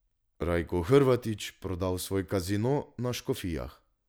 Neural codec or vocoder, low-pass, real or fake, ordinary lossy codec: none; none; real; none